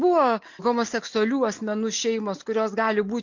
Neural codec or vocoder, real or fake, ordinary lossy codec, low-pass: none; real; MP3, 48 kbps; 7.2 kHz